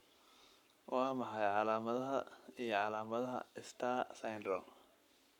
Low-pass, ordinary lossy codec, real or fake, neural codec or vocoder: 19.8 kHz; none; fake; codec, 44.1 kHz, 7.8 kbps, Pupu-Codec